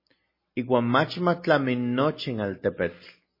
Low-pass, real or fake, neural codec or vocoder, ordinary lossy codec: 5.4 kHz; real; none; MP3, 24 kbps